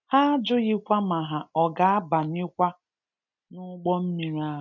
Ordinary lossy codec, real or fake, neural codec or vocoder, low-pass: none; real; none; 7.2 kHz